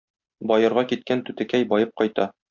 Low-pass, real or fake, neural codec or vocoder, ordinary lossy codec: 7.2 kHz; real; none; MP3, 64 kbps